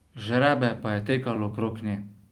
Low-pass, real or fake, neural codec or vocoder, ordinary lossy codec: 19.8 kHz; fake; vocoder, 44.1 kHz, 128 mel bands every 256 samples, BigVGAN v2; Opus, 24 kbps